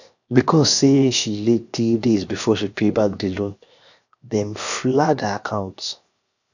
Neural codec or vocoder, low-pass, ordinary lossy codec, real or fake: codec, 16 kHz, 0.7 kbps, FocalCodec; 7.2 kHz; none; fake